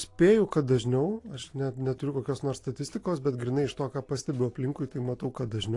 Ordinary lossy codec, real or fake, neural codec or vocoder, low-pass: AAC, 48 kbps; real; none; 10.8 kHz